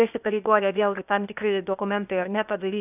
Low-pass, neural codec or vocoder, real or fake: 3.6 kHz; codec, 16 kHz, 0.8 kbps, ZipCodec; fake